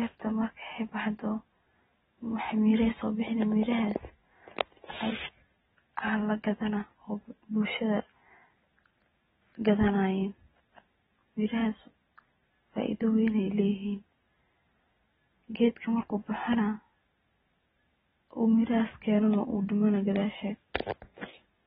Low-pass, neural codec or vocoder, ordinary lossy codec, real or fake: 10.8 kHz; none; AAC, 16 kbps; real